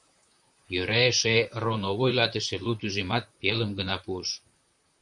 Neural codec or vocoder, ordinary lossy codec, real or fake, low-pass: vocoder, 44.1 kHz, 128 mel bands, Pupu-Vocoder; MP3, 64 kbps; fake; 10.8 kHz